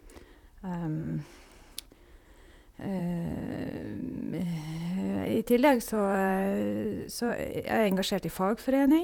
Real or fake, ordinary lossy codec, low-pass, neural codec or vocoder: fake; none; 19.8 kHz; vocoder, 44.1 kHz, 128 mel bands, Pupu-Vocoder